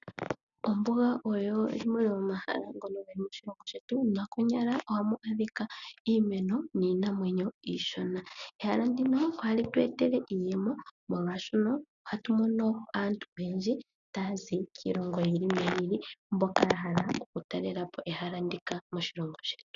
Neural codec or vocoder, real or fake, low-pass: none; real; 7.2 kHz